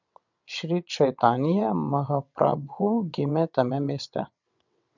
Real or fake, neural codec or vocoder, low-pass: fake; vocoder, 22.05 kHz, 80 mel bands, WaveNeXt; 7.2 kHz